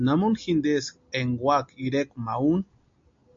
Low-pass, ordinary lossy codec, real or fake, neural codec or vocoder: 7.2 kHz; MP3, 48 kbps; real; none